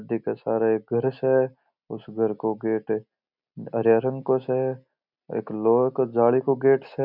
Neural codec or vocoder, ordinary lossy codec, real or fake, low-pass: none; none; real; 5.4 kHz